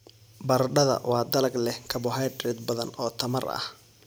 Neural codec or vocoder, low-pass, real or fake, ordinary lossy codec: none; none; real; none